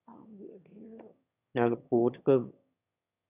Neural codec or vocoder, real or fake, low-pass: autoencoder, 22.05 kHz, a latent of 192 numbers a frame, VITS, trained on one speaker; fake; 3.6 kHz